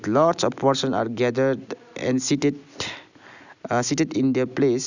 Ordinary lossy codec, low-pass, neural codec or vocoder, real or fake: none; 7.2 kHz; none; real